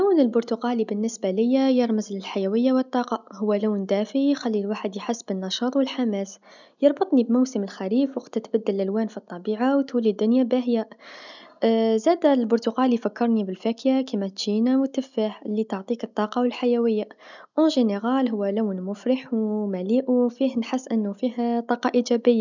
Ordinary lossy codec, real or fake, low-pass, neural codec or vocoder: none; real; 7.2 kHz; none